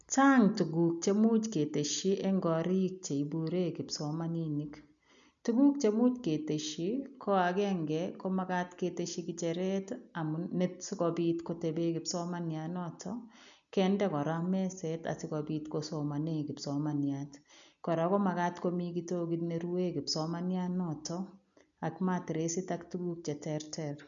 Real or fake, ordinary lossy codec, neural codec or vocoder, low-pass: real; none; none; 7.2 kHz